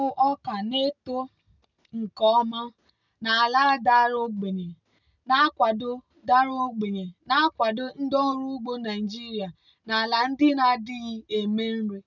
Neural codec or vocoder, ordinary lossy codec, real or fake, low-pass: none; none; real; 7.2 kHz